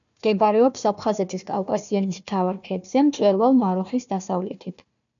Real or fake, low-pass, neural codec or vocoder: fake; 7.2 kHz; codec, 16 kHz, 1 kbps, FunCodec, trained on Chinese and English, 50 frames a second